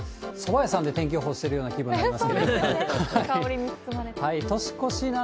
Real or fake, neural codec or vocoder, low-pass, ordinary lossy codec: real; none; none; none